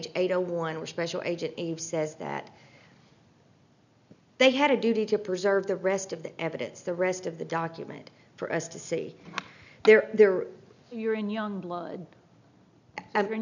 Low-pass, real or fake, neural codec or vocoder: 7.2 kHz; real; none